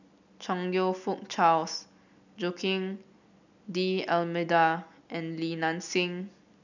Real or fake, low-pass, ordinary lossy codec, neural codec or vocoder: real; 7.2 kHz; none; none